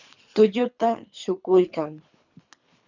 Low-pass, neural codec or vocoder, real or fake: 7.2 kHz; codec, 24 kHz, 3 kbps, HILCodec; fake